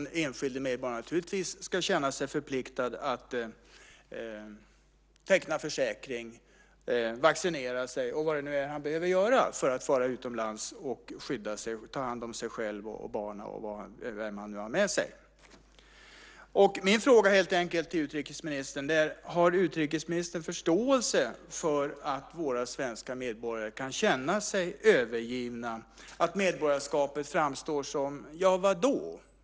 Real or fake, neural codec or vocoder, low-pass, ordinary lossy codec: real; none; none; none